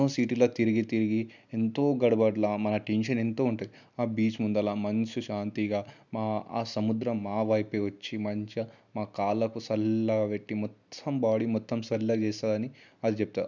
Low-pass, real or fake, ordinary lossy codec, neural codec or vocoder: 7.2 kHz; real; none; none